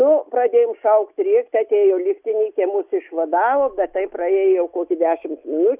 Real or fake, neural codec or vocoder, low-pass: real; none; 3.6 kHz